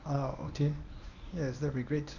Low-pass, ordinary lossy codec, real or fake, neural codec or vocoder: 7.2 kHz; none; fake; codec, 24 kHz, 0.9 kbps, WavTokenizer, medium speech release version 1